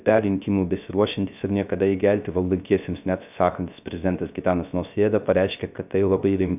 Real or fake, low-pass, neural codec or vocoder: fake; 3.6 kHz; codec, 16 kHz, 0.3 kbps, FocalCodec